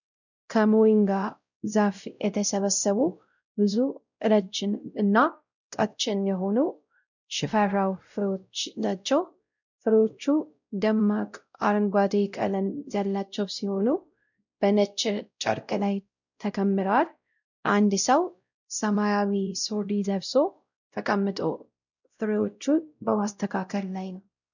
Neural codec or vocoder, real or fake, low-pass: codec, 16 kHz, 0.5 kbps, X-Codec, WavLM features, trained on Multilingual LibriSpeech; fake; 7.2 kHz